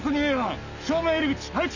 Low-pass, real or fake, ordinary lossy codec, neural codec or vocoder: 7.2 kHz; real; none; none